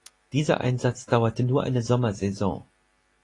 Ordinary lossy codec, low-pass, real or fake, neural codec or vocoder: AAC, 32 kbps; 10.8 kHz; real; none